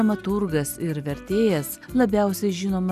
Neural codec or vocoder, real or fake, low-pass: none; real; 14.4 kHz